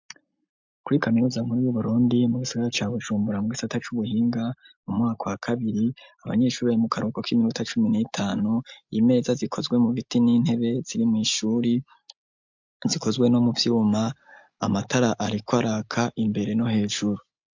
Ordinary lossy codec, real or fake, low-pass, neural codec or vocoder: MP3, 64 kbps; real; 7.2 kHz; none